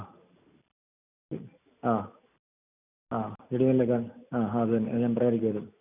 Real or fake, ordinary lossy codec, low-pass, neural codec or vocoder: real; none; 3.6 kHz; none